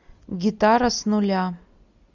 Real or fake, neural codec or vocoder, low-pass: real; none; 7.2 kHz